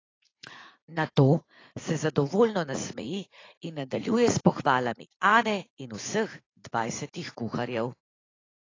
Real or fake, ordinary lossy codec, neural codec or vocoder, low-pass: fake; AAC, 32 kbps; vocoder, 44.1 kHz, 80 mel bands, Vocos; 7.2 kHz